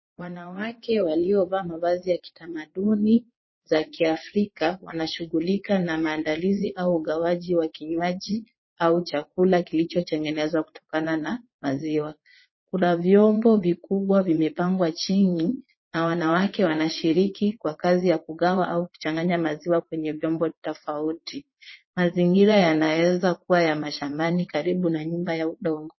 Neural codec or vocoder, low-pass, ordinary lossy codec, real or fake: vocoder, 22.05 kHz, 80 mel bands, Vocos; 7.2 kHz; MP3, 24 kbps; fake